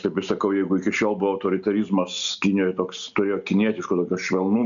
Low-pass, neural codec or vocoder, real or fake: 7.2 kHz; none; real